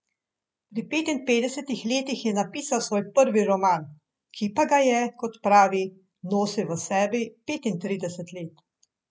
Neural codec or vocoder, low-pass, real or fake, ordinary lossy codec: none; none; real; none